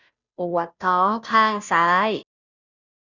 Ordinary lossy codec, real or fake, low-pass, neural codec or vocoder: none; fake; 7.2 kHz; codec, 16 kHz, 0.5 kbps, FunCodec, trained on Chinese and English, 25 frames a second